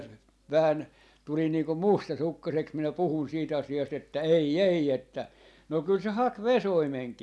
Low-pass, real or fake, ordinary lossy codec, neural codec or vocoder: none; real; none; none